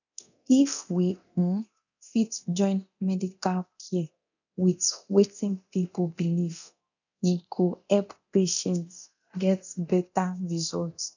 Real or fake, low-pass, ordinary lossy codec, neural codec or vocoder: fake; 7.2 kHz; none; codec, 24 kHz, 0.9 kbps, DualCodec